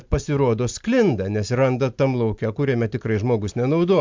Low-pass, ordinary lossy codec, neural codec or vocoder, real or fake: 7.2 kHz; MP3, 64 kbps; none; real